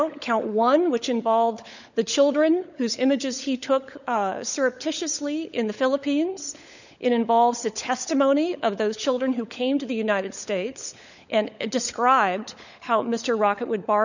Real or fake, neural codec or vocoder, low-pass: fake; codec, 16 kHz, 16 kbps, FunCodec, trained on LibriTTS, 50 frames a second; 7.2 kHz